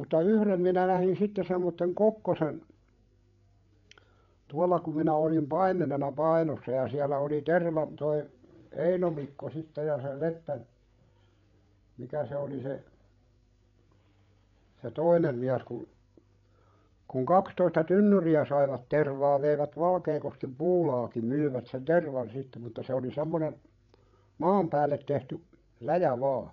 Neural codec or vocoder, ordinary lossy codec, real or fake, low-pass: codec, 16 kHz, 8 kbps, FreqCodec, larger model; MP3, 64 kbps; fake; 7.2 kHz